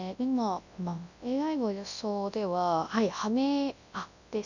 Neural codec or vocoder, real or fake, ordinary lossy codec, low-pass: codec, 24 kHz, 0.9 kbps, WavTokenizer, large speech release; fake; none; 7.2 kHz